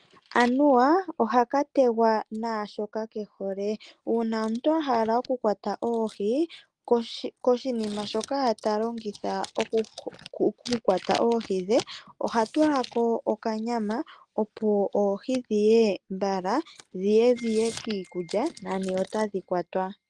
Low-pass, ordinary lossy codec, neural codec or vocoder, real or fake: 10.8 kHz; Opus, 24 kbps; none; real